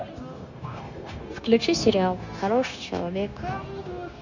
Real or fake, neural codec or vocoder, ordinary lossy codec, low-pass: fake; codec, 16 kHz, 0.9 kbps, LongCat-Audio-Codec; none; 7.2 kHz